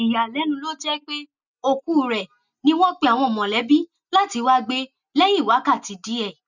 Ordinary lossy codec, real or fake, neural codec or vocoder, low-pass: none; real; none; 7.2 kHz